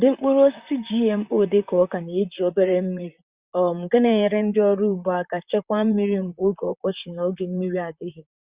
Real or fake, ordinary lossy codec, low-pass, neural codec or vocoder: fake; Opus, 64 kbps; 3.6 kHz; vocoder, 44.1 kHz, 128 mel bands, Pupu-Vocoder